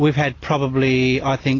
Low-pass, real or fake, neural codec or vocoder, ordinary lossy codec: 7.2 kHz; real; none; AAC, 32 kbps